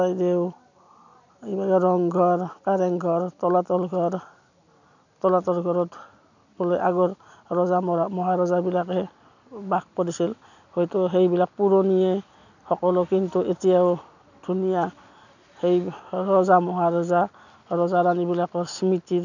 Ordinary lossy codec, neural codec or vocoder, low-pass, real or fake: none; none; 7.2 kHz; real